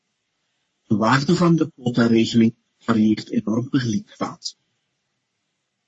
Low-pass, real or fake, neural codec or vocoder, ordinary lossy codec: 10.8 kHz; fake; codec, 44.1 kHz, 3.4 kbps, Pupu-Codec; MP3, 32 kbps